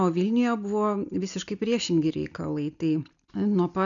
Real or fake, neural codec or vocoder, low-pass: real; none; 7.2 kHz